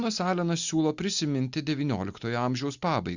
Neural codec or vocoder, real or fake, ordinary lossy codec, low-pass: none; real; Opus, 64 kbps; 7.2 kHz